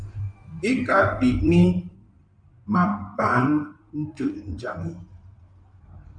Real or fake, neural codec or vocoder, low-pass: fake; codec, 16 kHz in and 24 kHz out, 2.2 kbps, FireRedTTS-2 codec; 9.9 kHz